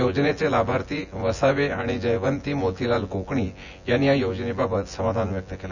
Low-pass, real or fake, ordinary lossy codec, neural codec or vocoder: 7.2 kHz; fake; none; vocoder, 24 kHz, 100 mel bands, Vocos